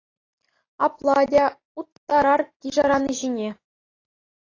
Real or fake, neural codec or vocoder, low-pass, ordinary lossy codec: real; none; 7.2 kHz; AAC, 32 kbps